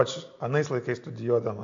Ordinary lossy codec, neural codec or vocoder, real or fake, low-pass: AAC, 48 kbps; none; real; 7.2 kHz